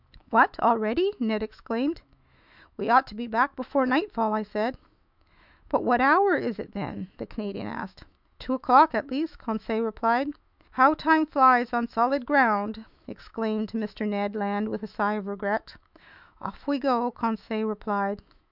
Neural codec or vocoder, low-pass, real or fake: autoencoder, 48 kHz, 128 numbers a frame, DAC-VAE, trained on Japanese speech; 5.4 kHz; fake